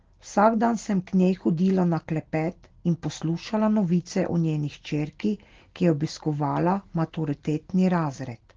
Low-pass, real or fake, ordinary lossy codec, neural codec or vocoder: 7.2 kHz; real; Opus, 16 kbps; none